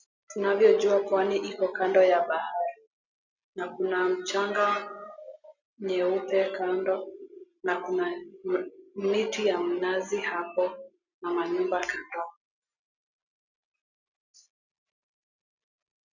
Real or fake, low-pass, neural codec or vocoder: real; 7.2 kHz; none